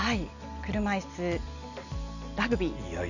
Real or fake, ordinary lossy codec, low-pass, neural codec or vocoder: real; none; 7.2 kHz; none